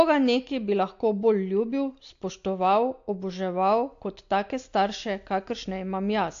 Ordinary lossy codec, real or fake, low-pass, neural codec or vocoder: AAC, 48 kbps; real; 7.2 kHz; none